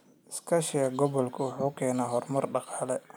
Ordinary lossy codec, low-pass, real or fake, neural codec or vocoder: none; none; real; none